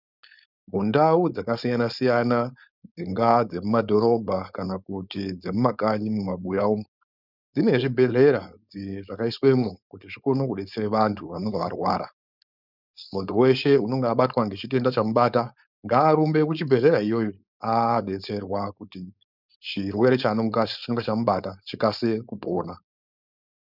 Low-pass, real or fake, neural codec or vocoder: 5.4 kHz; fake; codec, 16 kHz, 4.8 kbps, FACodec